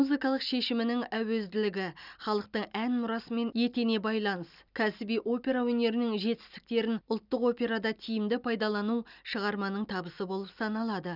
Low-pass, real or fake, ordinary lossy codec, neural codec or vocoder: 5.4 kHz; real; none; none